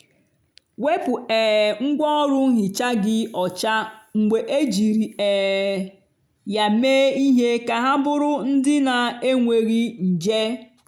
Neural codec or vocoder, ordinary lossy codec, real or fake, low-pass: none; none; real; 19.8 kHz